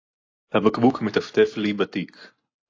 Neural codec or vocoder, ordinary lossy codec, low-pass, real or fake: none; AAC, 32 kbps; 7.2 kHz; real